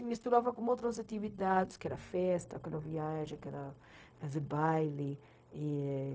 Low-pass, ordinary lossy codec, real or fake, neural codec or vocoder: none; none; fake; codec, 16 kHz, 0.4 kbps, LongCat-Audio-Codec